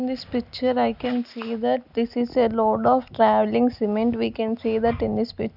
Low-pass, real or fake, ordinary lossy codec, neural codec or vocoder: 5.4 kHz; real; none; none